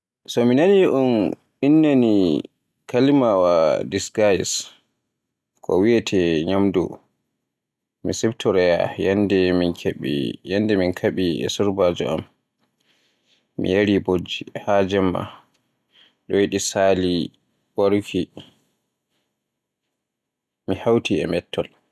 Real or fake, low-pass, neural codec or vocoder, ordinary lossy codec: real; none; none; none